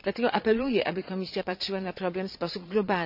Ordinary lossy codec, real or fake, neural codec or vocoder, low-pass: none; fake; vocoder, 22.05 kHz, 80 mel bands, Vocos; 5.4 kHz